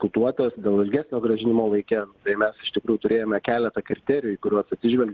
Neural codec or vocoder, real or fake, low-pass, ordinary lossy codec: none; real; 7.2 kHz; Opus, 16 kbps